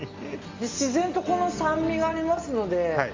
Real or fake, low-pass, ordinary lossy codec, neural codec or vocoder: real; 7.2 kHz; Opus, 32 kbps; none